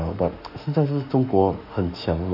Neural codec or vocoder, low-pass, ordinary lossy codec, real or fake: autoencoder, 48 kHz, 32 numbers a frame, DAC-VAE, trained on Japanese speech; 5.4 kHz; none; fake